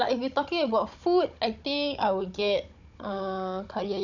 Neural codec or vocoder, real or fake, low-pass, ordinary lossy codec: codec, 16 kHz, 16 kbps, FunCodec, trained on Chinese and English, 50 frames a second; fake; 7.2 kHz; none